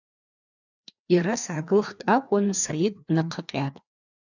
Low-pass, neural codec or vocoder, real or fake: 7.2 kHz; codec, 16 kHz, 2 kbps, FreqCodec, larger model; fake